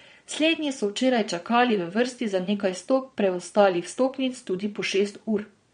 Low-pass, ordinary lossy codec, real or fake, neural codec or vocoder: 9.9 kHz; MP3, 48 kbps; fake; vocoder, 22.05 kHz, 80 mel bands, Vocos